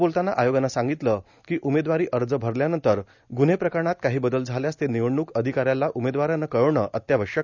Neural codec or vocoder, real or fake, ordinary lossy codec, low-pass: none; real; none; 7.2 kHz